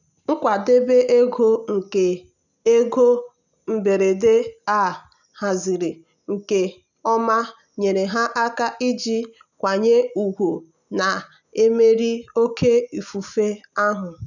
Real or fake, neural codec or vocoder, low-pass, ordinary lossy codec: real; none; 7.2 kHz; none